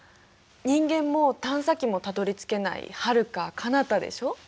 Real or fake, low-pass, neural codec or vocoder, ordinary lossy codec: real; none; none; none